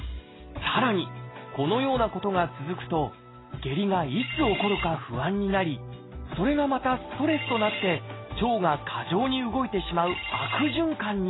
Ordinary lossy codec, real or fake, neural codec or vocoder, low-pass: AAC, 16 kbps; real; none; 7.2 kHz